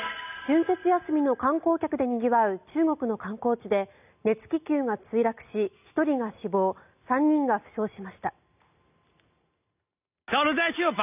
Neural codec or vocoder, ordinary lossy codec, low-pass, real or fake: none; none; 3.6 kHz; real